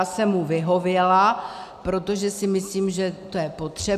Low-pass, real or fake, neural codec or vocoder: 14.4 kHz; real; none